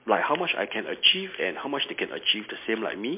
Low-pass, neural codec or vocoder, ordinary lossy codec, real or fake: 3.6 kHz; none; MP3, 24 kbps; real